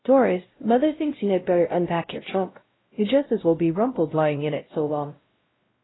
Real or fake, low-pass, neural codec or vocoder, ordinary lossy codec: fake; 7.2 kHz; codec, 16 kHz, 0.5 kbps, X-Codec, WavLM features, trained on Multilingual LibriSpeech; AAC, 16 kbps